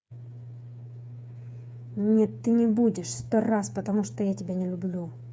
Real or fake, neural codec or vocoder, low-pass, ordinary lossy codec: fake; codec, 16 kHz, 8 kbps, FreqCodec, smaller model; none; none